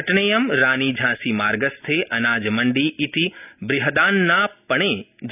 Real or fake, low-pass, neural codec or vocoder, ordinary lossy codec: real; 3.6 kHz; none; none